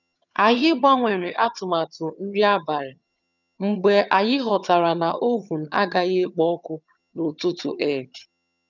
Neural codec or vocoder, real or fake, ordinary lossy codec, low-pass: vocoder, 22.05 kHz, 80 mel bands, HiFi-GAN; fake; none; 7.2 kHz